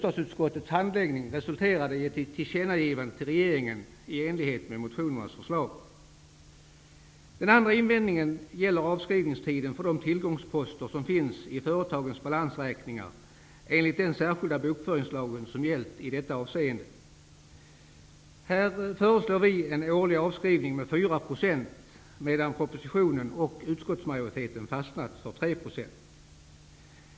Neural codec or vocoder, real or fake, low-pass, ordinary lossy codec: none; real; none; none